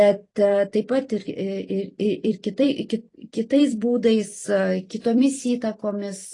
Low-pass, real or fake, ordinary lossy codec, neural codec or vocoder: 10.8 kHz; fake; AAC, 32 kbps; vocoder, 44.1 kHz, 128 mel bands every 256 samples, BigVGAN v2